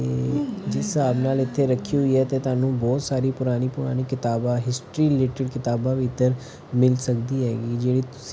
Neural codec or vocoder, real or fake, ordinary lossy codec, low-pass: none; real; none; none